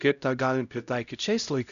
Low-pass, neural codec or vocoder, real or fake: 7.2 kHz; codec, 16 kHz, 0.5 kbps, X-Codec, HuBERT features, trained on LibriSpeech; fake